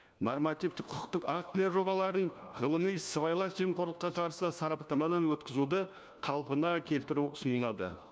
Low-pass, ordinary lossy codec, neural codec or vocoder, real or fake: none; none; codec, 16 kHz, 1 kbps, FunCodec, trained on LibriTTS, 50 frames a second; fake